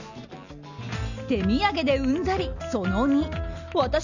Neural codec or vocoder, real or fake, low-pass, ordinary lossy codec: none; real; 7.2 kHz; none